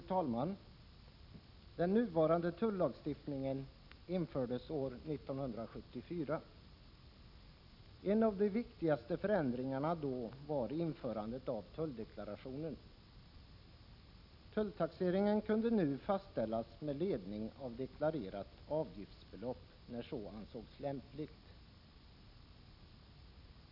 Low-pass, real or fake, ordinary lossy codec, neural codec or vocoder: 5.4 kHz; real; none; none